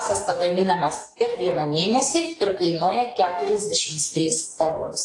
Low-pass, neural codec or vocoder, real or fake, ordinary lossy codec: 10.8 kHz; codec, 44.1 kHz, 2.6 kbps, DAC; fake; AAC, 48 kbps